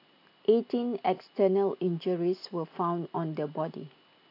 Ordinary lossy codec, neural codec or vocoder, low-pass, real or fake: AAC, 32 kbps; none; 5.4 kHz; real